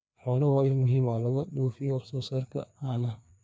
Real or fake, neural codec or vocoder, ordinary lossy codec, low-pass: fake; codec, 16 kHz, 2 kbps, FreqCodec, larger model; none; none